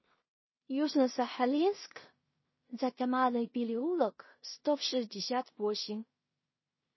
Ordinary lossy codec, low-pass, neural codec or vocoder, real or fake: MP3, 24 kbps; 7.2 kHz; codec, 16 kHz in and 24 kHz out, 0.4 kbps, LongCat-Audio-Codec, two codebook decoder; fake